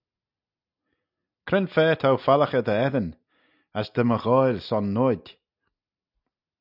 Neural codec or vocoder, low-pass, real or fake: none; 5.4 kHz; real